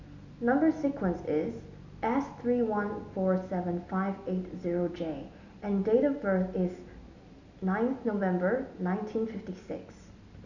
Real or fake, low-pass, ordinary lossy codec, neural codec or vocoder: real; 7.2 kHz; none; none